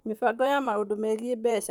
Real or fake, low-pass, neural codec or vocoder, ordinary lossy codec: fake; 19.8 kHz; vocoder, 44.1 kHz, 128 mel bands, Pupu-Vocoder; none